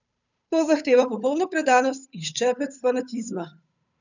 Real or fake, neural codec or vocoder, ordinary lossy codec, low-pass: fake; codec, 16 kHz, 8 kbps, FunCodec, trained on Chinese and English, 25 frames a second; none; 7.2 kHz